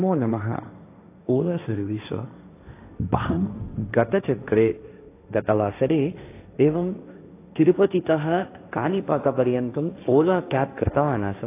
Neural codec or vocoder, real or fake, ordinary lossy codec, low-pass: codec, 16 kHz, 1.1 kbps, Voila-Tokenizer; fake; AAC, 24 kbps; 3.6 kHz